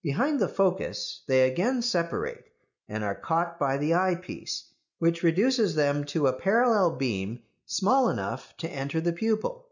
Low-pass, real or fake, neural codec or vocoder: 7.2 kHz; real; none